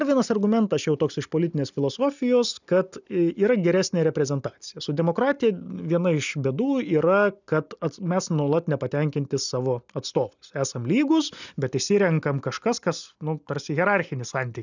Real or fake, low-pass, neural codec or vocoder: real; 7.2 kHz; none